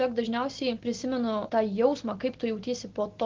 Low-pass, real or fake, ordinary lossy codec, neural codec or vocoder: 7.2 kHz; real; Opus, 16 kbps; none